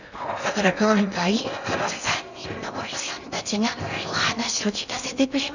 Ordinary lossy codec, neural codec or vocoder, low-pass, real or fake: none; codec, 16 kHz in and 24 kHz out, 0.6 kbps, FocalCodec, streaming, 4096 codes; 7.2 kHz; fake